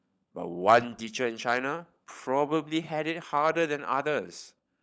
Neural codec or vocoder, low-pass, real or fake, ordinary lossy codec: codec, 16 kHz, 16 kbps, FunCodec, trained on LibriTTS, 50 frames a second; none; fake; none